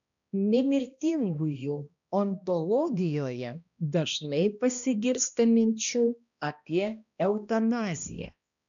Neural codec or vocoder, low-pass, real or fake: codec, 16 kHz, 1 kbps, X-Codec, HuBERT features, trained on balanced general audio; 7.2 kHz; fake